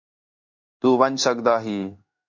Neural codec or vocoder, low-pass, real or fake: codec, 16 kHz in and 24 kHz out, 1 kbps, XY-Tokenizer; 7.2 kHz; fake